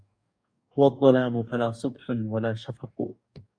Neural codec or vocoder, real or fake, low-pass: codec, 44.1 kHz, 2.6 kbps, DAC; fake; 9.9 kHz